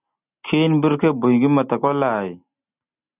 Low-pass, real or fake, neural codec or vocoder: 3.6 kHz; real; none